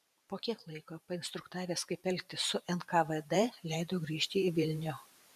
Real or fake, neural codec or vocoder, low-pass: fake; vocoder, 44.1 kHz, 128 mel bands every 512 samples, BigVGAN v2; 14.4 kHz